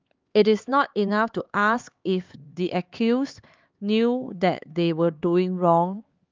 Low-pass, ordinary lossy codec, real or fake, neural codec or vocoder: 7.2 kHz; Opus, 32 kbps; fake; codec, 16 kHz, 4 kbps, X-Codec, HuBERT features, trained on LibriSpeech